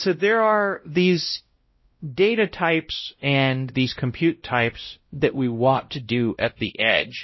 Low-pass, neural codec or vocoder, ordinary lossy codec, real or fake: 7.2 kHz; codec, 16 kHz, 0.5 kbps, X-Codec, WavLM features, trained on Multilingual LibriSpeech; MP3, 24 kbps; fake